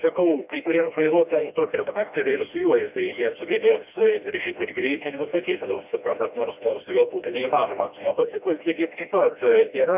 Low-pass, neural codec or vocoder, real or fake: 3.6 kHz; codec, 16 kHz, 1 kbps, FreqCodec, smaller model; fake